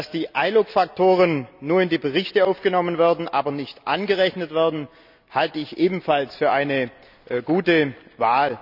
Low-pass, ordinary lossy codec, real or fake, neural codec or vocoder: 5.4 kHz; none; real; none